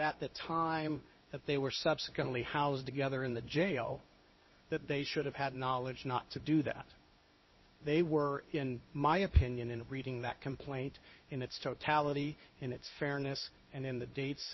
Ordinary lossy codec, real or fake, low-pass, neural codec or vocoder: MP3, 24 kbps; fake; 7.2 kHz; codec, 16 kHz in and 24 kHz out, 1 kbps, XY-Tokenizer